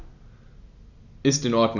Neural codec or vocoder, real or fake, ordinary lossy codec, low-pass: none; real; none; 7.2 kHz